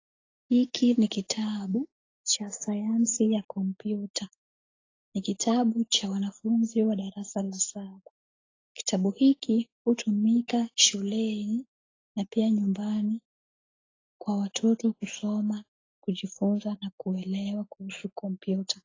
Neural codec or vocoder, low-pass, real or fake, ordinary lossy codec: none; 7.2 kHz; real; AAC, 32 kbps